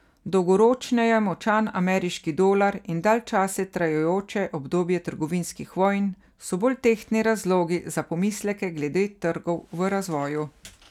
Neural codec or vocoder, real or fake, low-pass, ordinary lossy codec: none; real; 19.8 kHz; none